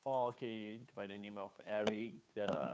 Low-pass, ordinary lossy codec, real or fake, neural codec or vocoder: none; none; fake; codec, 16 kHz, 2 kbps, FunCodec, trained on Chinese and English, 25 frames a second